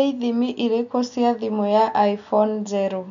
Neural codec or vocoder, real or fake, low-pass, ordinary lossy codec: none; real; 7.2 kHz; none